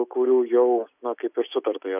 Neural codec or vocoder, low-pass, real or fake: none; 3.6 kHz; real